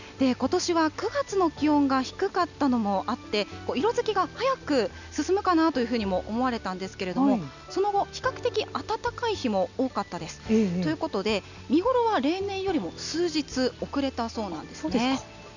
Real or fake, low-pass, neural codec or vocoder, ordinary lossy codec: real; 7.2 kHz; none; none